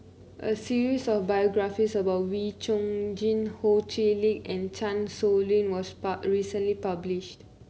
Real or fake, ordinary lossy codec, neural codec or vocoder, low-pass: real; none; none; none